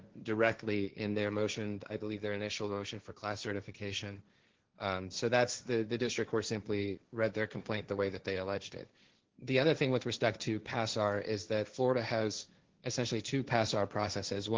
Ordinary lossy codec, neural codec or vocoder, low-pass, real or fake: Opus, 16 kbps; codec, 16 kHz, 1.1 kbps, Voila-Tokenizer; 7.2 kHz; fake